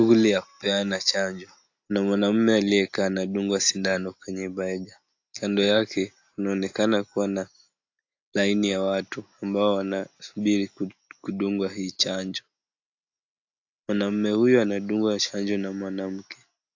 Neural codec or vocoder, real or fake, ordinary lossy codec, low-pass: none; real; AAC, 48 kbps; 7.2 kHz